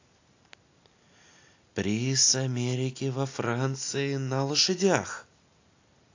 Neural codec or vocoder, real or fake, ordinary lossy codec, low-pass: none; real; AAC, 48 kbps; 7.2 kHz